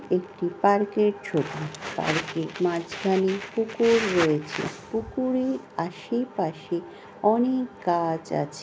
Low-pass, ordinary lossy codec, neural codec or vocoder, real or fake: none; none; none; real